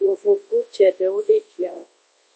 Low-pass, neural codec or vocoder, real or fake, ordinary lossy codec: 10.8 kHz; codec, 24 kHz, 0.9 kbps, WavTokenizer, large speech release; fake; MP3, 32 kbps